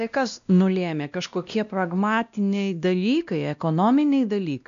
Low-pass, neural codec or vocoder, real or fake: 7.2 kHz; codec, 16 kHz, 1 kbps, X-Codec, WavLM features, trained on Multilingual LibriSpeech; fake